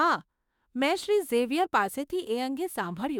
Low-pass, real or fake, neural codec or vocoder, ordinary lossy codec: 19.8 kHz; fake; autoencoder, 48 kHz, 32 numbers a frame, DAC-VAE, trained on Japanese speech; none